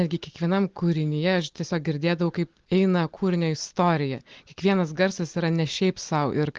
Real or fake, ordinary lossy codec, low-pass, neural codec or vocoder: real; Opus, 16 kbps; 7.2 kHz; none